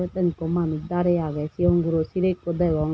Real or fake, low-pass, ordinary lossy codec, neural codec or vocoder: real; none; none; none